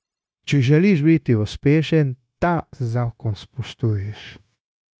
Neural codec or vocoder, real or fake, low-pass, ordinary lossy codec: codec, 16 kHz, 0.9 kbps, LongCat-Audio-Codec; fake; none; none